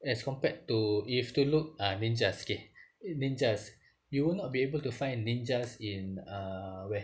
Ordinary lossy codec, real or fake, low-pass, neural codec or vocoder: none; real; none; none